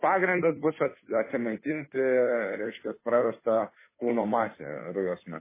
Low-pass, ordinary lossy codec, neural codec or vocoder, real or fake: 3.6 kHz; MP3, 16 kbps; codec, 16 kHz, 2 kbps, FunCodec, trained on Chinese and English, 25 frames a second; fake